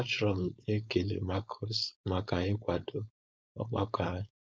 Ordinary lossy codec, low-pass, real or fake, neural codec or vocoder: none; none; fake; codec, 16 kHz, 4.8 kbps, FACodec